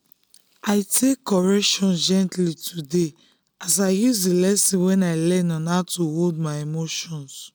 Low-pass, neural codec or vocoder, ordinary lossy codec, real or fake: none; none; none; real